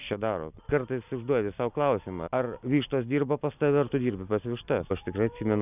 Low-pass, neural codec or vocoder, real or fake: 3.6 kHz; none; real